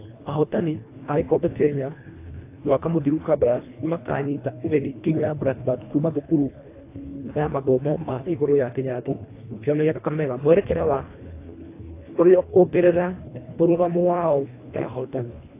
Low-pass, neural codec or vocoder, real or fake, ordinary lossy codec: 3.6 kHz; codec, 24 kHz, 1.5 kbps, HILCodec; fake; AAC, 24 kbps